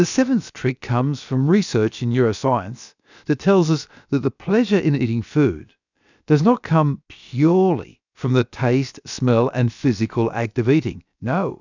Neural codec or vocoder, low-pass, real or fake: codec, 16 kHz, about 1 kbps, DyCAST, with the encoder's durations; 7.2 kHz; fake